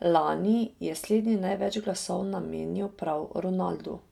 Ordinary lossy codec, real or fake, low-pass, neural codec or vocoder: none; real; 19.8 kHz; none